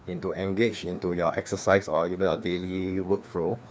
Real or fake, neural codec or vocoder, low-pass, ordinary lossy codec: fake; codec, 16 kHz, 2 kbps, FreqCodec, larger model; none; none